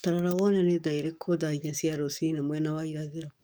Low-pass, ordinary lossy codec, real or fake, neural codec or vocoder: none; none; fake; codec, 44.1 kHz, 7.8 kbps, DAC